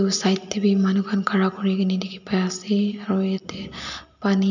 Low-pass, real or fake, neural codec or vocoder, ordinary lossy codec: 7.2 kHz; real; none; none